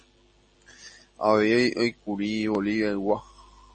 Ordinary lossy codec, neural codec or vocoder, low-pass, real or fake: MP3, 32 kbps; none; 10.8 kHz; real